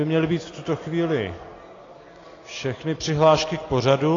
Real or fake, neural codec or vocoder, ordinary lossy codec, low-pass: real; none; AAC, 32 kbps; 7.2 kHz